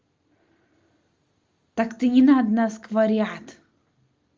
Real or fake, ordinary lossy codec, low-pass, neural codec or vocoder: real; Opus, 32 kbps; 7.2 kHz; none